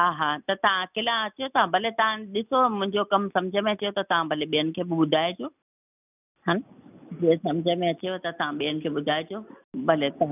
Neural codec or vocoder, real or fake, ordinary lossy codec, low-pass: none; real; none; 3.6 kHz